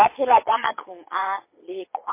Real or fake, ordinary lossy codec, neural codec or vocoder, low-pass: fake; MP3, 24 kbps; vocoder, 22.05 kHz, 80 mel bands, Vocos; 3.6 kHz